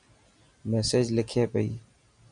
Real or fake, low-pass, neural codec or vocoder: real; 9.9 kHz; none